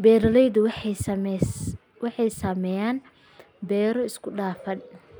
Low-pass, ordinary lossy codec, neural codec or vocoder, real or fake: none; none; none; real